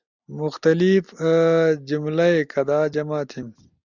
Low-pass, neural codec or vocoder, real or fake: 7.2 kHz; none; real